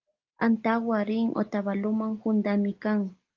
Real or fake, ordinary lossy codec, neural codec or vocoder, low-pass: real; Opus, 32 kbps; none; 7.2 kHz